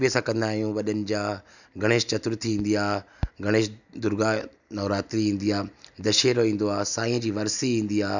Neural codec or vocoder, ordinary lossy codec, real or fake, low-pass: none; none; real; 7.2 kHz